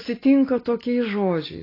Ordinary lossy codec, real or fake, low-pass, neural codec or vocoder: AAC, 24 kbps; real; 5.4 kHz; none